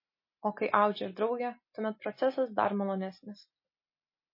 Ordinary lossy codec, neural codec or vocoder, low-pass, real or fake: MP3, 24 kbps; none; 5.4 kHz; real